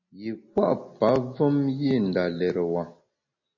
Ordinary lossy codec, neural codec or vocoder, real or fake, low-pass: MP3, 32 kbps; none; real; 7.2 kHz